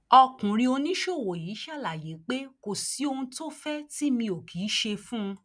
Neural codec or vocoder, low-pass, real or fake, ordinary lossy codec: none; 9.9 kHz; real; none